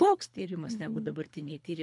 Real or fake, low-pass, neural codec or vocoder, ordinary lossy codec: fake; 10.8 kHz; codec, 24 kHz, 3 kbps, HILCodec; MP3, 64 kbps